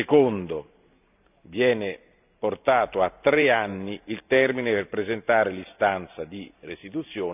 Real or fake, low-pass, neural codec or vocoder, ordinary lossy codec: fake; 3.6 kHz; vocoder, 44.1 kHz, 128 mel bands every 512 samples, BigVGAN v2; none